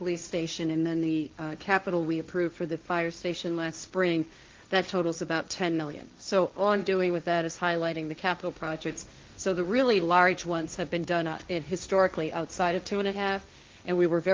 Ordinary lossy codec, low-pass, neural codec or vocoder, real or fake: Opus, 32 kbps; 7.2 kHz; codec, 16 kHz, 1.1 kbps, Voila-Tokenizer; fake